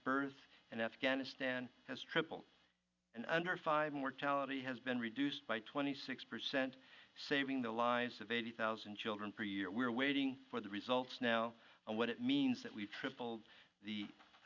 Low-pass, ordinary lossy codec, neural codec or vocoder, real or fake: 7.2 kHz; Opus, 64 kbps; none; real